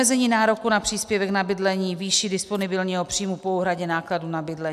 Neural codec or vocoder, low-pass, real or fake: none; 14.4 kHz; real